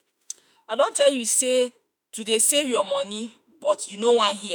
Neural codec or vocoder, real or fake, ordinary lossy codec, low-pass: autoencoder, 48 kHz, 32 numbers a frame, DAC-VAE, trained on Japanese speech; fake; none; none